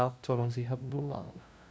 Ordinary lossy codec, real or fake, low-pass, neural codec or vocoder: none; fake; none; codec, 16 kHz, 0.5 kbps, FunCodec, trained on LibriTTS, 25 frames a second